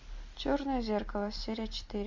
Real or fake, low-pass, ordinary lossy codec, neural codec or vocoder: real; 7.2 kHz; MP3, 64 kbps; none